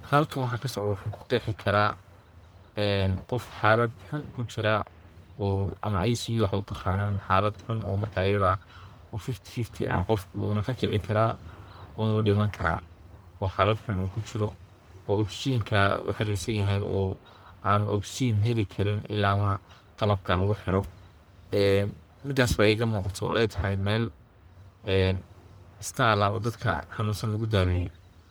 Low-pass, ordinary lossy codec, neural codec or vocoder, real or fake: none; none; codec, 44.1 kHz, 1.7 kbps, Pupu-Codec; fake